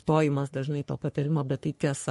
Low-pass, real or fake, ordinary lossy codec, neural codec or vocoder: 14.4 kHz; fake; MP3, 48 kbps; codec, 44.1 kHz, 3.4 kbps, Pupu-Codec